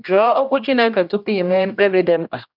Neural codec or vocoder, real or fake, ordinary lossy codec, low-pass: codec, 16 kHz, 1 kbps, X-Codec, HuBERT features, trained on general audio; fake; none; 5.4 kHz